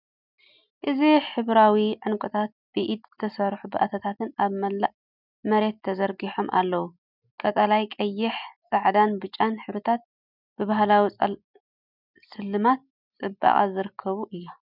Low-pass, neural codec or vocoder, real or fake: 5.4 kHz; none; real